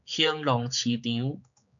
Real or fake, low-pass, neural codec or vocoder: fake; 7.2 kHz; codec, 16 kHz, 4 kbps, X-Codec, HuBERT features, trained on general audio